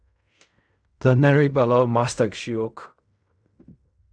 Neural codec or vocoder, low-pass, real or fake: codec, 16 kHz in and 24 kHz out, 0.4 kbps, LongCat-Audio-Codec, fine tuned four codebook decoder; 9.9 kHz; fake